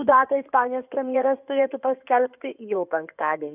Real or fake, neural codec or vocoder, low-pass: fake; codec, 16 kHz in and 24 kHz out, 2.2 kbps, FireRedTTS-2 codec; 3.6 kHz